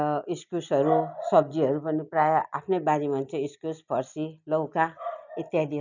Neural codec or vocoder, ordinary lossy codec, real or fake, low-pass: none; none; real; 7.2 kHz